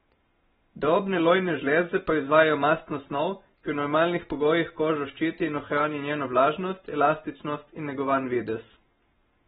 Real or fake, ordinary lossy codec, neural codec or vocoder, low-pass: real; AAC, 16 kbps; none; 19.8 kHz